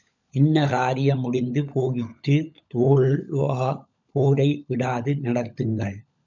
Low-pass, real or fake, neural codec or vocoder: 7.2 kHz; fake; codec, 16 kHz, 16 kbps, FunCodec, trained on LibriTTS, 50 frames a second